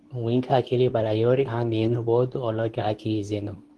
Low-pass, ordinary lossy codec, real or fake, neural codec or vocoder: 10.8 kHz; Opus, 16 kbps; fake; codec, 24 kHz, 0.9 kbps, WavTokenizer, medium speech release version 2